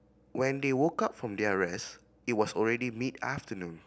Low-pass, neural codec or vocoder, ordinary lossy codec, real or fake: none; none; none; real